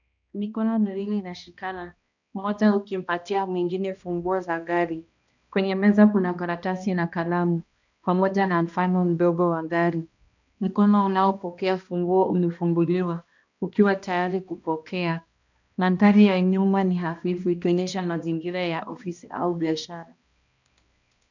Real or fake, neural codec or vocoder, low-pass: fake; codec, 16 kHz, 1 kbps, X-Codec, HuBERT features, trained on balanced general audio; 7.2 kHz